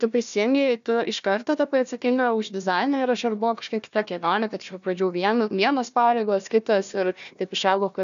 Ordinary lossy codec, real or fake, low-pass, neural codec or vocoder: AAC, 96 kbps; fake; 7.2 kHz; codec, 16 kHz, 1 kbps, FunCodec, trained on Chinese and English, 50 frames a second